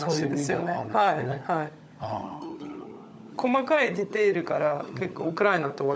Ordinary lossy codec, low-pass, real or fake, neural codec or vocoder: none; none; fake; codec, 16 kHz, 16 kbps, FunCodec, trained on LibriTTS, 50 frames a second